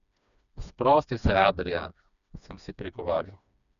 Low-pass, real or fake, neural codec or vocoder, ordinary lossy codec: 7.2 kHz; fake; codec, 16 kHz, 2 kbps, FreqCodec, smaller model; none